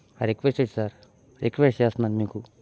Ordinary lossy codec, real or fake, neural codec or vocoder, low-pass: none; real; none; none